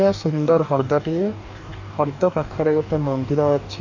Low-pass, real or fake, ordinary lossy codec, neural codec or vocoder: 7.2 kHz; fake; none; codec, 44.1 kHz, 2.6 kbps, DAC